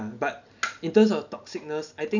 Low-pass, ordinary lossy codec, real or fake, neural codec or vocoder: 7.2 kHz; none; real; none